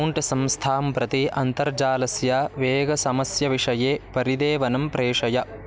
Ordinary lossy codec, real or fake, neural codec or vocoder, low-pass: none; real; none; none